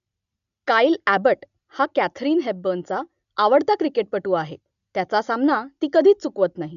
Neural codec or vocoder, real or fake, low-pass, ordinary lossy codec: none; real; 7.2 kHz; none